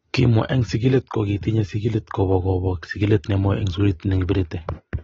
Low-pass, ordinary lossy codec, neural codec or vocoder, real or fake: 19.8 kHz; AAC, 24 kbps; none; real